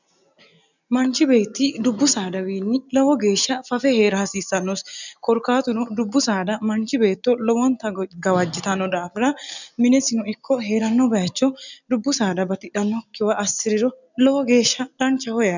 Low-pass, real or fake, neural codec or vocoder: 7.2 kHz; real; none